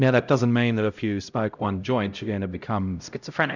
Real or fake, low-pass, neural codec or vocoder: fake; 7.2 kHz; codec, 16 kHz, 0.5 kbps, X-Codec, HuBERT features, trained on LibriSpeech